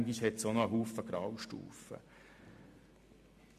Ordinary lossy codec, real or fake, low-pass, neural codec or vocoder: MP3, 64 kbps; real; 14.4 kHz; none